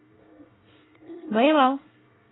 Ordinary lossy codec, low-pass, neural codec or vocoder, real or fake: AAC, 16 kbps; 7.2 kHz; codec, 24 kHz, 1 kbps, SNAC; fake